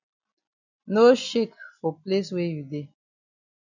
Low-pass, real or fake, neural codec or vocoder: 7.2 kHz; real; none